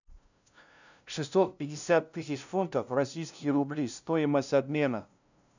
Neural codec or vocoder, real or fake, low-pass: codec, 16 kHz, 0.5 kbps, FunCodec, trained on LibriTTS, 25 frames a second; fake; 7.2 kHz